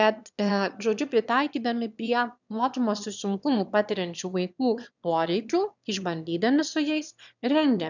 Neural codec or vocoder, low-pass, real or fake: autoencoder, 22.05 kHz, a latent of 192 numbers a frame, VITS, trained on one speaker; 7.2 kHz; fake